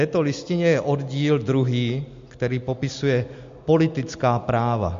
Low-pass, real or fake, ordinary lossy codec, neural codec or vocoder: 7.2 kHz; real; MP3, 48 kbps; none